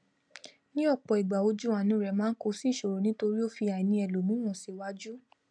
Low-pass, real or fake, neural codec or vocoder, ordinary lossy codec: 9.9 kHz; real; none; none